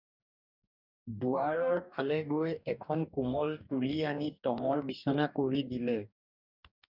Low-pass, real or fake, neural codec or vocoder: 5.4 kHz; fake; codec, 44.1 kHz, 2.6 kbps, DAC